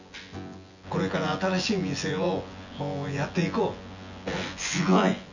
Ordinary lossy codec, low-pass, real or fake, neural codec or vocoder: none; 7.2 kHz; fake; vocoder, 24 kHz, 100 mel bands, Vocos